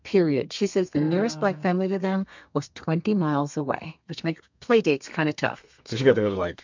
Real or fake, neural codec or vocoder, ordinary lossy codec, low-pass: fake; codec, 32 kHz, 1.9 kbps, SNAC; MP3, 64 kbps; 7.2 kHz